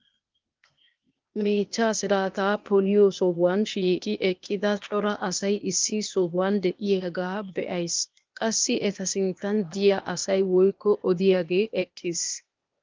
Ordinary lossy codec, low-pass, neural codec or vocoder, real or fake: Opus, 32 kbps; 7.2 kHz; codec, 16 kHz, 0.8 kbps, ZipCodec; fake